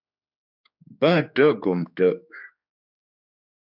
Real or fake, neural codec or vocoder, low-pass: fake; codec, 16 kHz, 4 kbps, X-Codec, HuBERT features, trained on general audio; 5.4 kHz